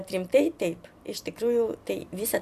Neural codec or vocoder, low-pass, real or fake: vocoder, 44.1 kHz, 128 mel bands every 256 samples, BigVGAN v2; 14.4 kHz; fake